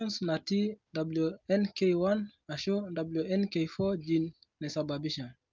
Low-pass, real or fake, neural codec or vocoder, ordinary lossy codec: 7.2 kHz; real; none; Opus, 32 kbps